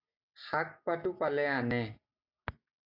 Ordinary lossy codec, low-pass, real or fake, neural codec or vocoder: AAC, 48 kbps; 5.4 kHz; real; none